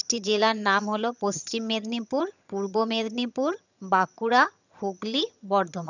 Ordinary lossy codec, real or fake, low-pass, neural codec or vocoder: none; fake; 7.2 kHz; vocoder, 22.05 kHz, 80 mel bands, HiFi-GAN